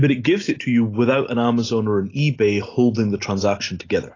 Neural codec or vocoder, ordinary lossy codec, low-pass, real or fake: none; AAC, 32 kbps; 7.2 kHz; real